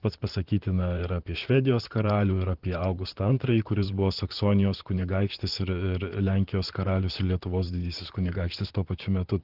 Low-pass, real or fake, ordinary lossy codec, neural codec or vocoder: 5.4 kHz; fake; Opus, 16 kbps; vocoder, 44.1 kHz, 128 mel bands, Pupu-Vocoder